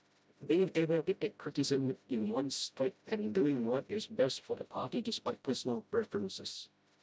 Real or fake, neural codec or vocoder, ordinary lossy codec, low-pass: fake; codec, 16 kHz, 0.5 kbps, FreqCodec, smaller model; none; none